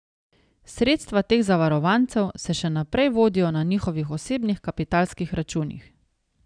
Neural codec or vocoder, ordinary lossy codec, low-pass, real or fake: none; none; 9.9 kHz; real